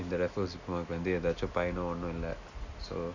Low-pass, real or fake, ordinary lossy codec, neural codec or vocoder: 7.2 kHz; real; none; none